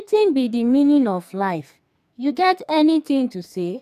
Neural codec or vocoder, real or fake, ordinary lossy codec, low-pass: codec, 44.1 kHz, 2.6 kbps, SNAC; fake; none; 14.4 kHz